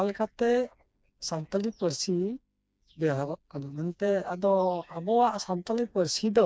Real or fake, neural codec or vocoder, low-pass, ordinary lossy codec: fake; codec, 16 kHz, 2 kbps, FreqCodec, smaller model; none; none